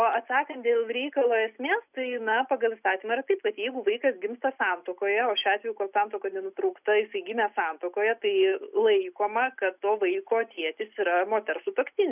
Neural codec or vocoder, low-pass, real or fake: none; 3.6 kHz; real